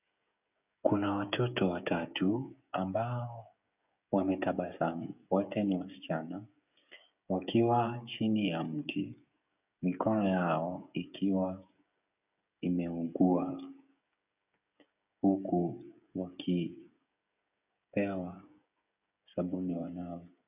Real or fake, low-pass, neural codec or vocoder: fake; 3.6 kHz; codec, 16 kHz, 16 kbps, FreqCodec, smaller model